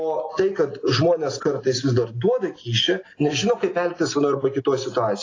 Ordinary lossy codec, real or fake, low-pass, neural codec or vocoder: AAC, 32 kbps; real; 7.2 kHz; none